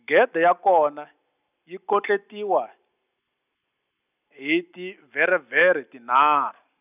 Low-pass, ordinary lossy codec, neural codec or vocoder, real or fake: 3.6 kHz; none; none; real